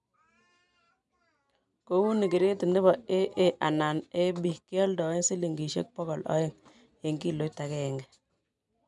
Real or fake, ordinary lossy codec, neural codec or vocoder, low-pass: real; none; none; 10.8 kHz